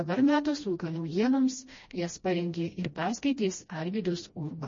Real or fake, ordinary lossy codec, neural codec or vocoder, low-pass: fake; MP3, 32 kbps; codec, 16 kHz, 1 kbps, FreqCodec, smaller model; 7.2 kHz